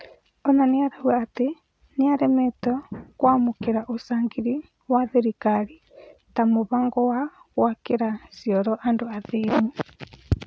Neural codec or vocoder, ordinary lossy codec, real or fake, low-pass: none; none; real; none